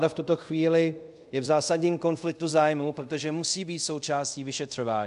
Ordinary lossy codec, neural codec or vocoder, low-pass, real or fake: MP3, 64 kbps; codec, 24 kHz, 0.5 kbps, DualCodec; 10.8 kHz; fake